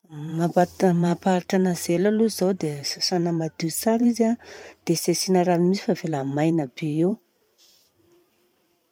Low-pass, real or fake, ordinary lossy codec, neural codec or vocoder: 19.8 kHz; fake; none; vocoder, 44.1 kHz, 128 mel bands every 512 samples, BigVGAN v2